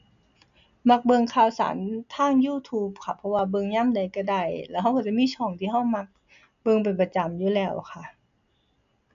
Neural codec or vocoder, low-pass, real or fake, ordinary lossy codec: none; 7.2 kHz; real; none